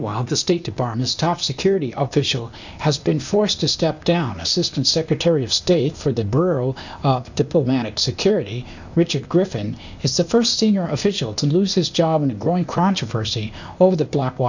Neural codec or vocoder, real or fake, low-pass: codec, 16 kHz, 0.8 kbps, ZipCodec; fake; 7.2 kHz